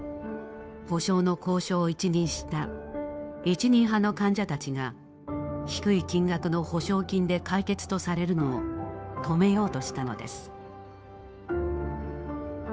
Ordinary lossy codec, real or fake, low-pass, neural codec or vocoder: none; fake; none; codec, 16 kHz, 2 kbps, FunCodec, trained on Chinese and English, 25 frames a second